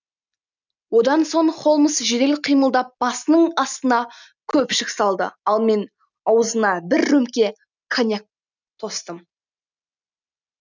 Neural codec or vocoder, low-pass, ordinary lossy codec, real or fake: none; 7.2 kHz; none; real